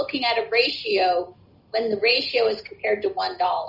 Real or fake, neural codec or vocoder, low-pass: real; none; 5.4 kHz